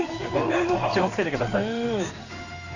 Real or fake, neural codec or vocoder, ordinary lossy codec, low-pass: fake; codec, 16 kHz in and 24 kHz out, 1 kbps, XY-Tokenizer; none; 7.2 kHz